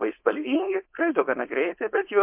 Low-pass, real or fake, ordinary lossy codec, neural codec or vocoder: 3.6 kHz; fake; MP3, 32 kbps; codec, 16 kHz, 4.8 kbps, FACodec